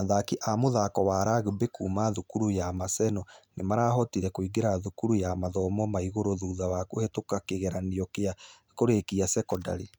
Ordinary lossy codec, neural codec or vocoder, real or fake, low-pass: none; none; real; none